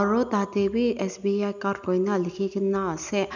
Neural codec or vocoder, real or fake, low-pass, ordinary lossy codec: none; real; 7.2 kHz; none